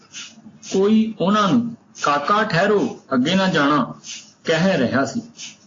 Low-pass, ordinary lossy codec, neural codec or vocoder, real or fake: 7.2 kHz; AAC, 32 kbps; none; real